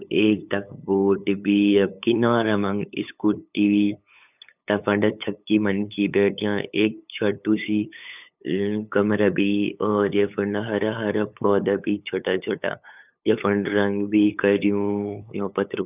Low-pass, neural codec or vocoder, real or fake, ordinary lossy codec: 3.6 kHz; codec, 16 kHz, 8 kbps, FunCodec, trained on LibriTTS, 25 frames a second; fake; none